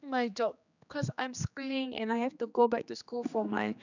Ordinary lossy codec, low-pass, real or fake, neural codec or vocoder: none; 7.2 kHz; fake; codec, 16 kHz, 2 kbps, X-Codec, HuBERT features, trained on general audio